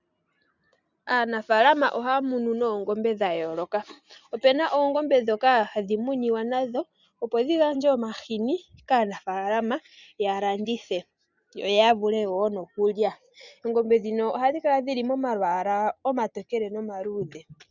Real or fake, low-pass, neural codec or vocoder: real; 7.2 kHz; none